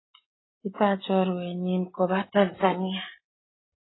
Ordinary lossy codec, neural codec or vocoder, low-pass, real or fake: AAC, 16 kbps; none; 7.2 kHz; real